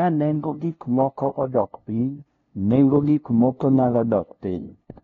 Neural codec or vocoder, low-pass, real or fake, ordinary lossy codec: codec, 16 kHz, 0.5 kbps, FunCodec, trained on LibriTTS, 25 frames a second; 7.2 kHz; fake; AAC, 32 kbps